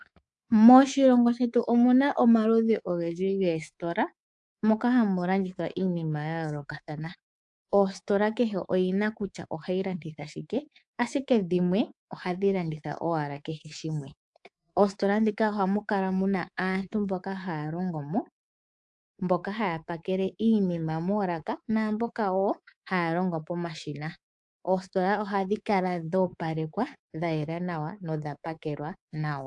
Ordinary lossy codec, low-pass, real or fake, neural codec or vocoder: AAC, 64 kbps; 10.8 kHz; fake; codec, 24 kHz, 3.1 kbps, DualCodec